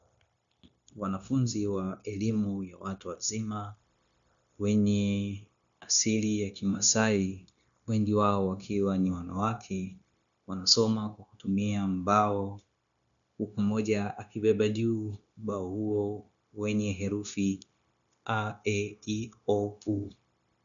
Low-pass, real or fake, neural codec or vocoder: 7.2 kHz; fake; codec, 16 kHz, 0.9 kbps, LongCat-Audio-Codec